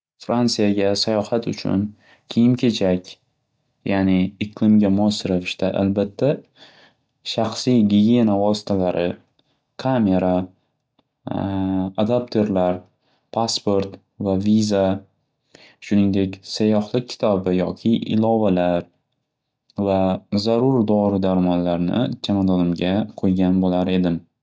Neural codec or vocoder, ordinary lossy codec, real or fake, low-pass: none; none; real; none